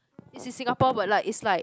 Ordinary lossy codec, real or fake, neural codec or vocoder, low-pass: none; real; none; none